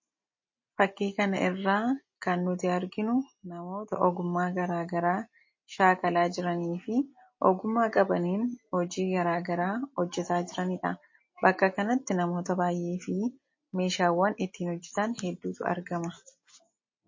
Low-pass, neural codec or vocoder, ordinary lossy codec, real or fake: 7.2 kHz; none; MP3, 32 kbps; real